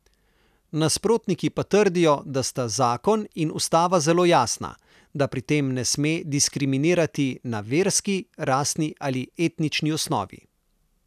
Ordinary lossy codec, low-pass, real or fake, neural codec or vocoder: none; 14.4 kHz; real; none